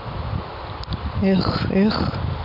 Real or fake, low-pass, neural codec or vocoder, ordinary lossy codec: real; 5.4 kHz; none; none